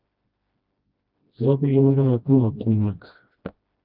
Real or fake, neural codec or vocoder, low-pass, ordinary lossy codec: fake; codec, 16 kHz, 1 kbps, FreqCodec, smaller model; 5.4 kHz; Opus, 32 kbps